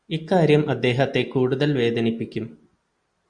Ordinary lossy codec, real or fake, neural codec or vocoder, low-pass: Opus, 64 kbps; real; none; 9.9 kHz